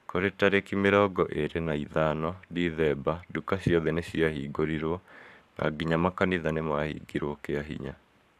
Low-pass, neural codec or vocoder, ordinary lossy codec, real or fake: 14.4 kHz; codec, 44.1 kHz, 7.8 kbps, Pupu-Codec; none; fake